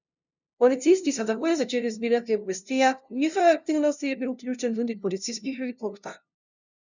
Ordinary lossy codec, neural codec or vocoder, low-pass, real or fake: none; codec, 16 kHz, 0.5 kbps, FunCodec, trained on LibriTTS, 25 frames a second; 7.2 kHz; fake